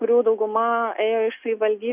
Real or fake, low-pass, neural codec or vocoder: real; 3.6 kHz; none